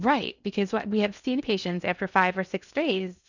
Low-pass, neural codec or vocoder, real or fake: 7.2 kHz; codec, 16 kHz in and 24 kHz out, 0.8 kbps, FocalCodec, streaming, 65536 codes; fake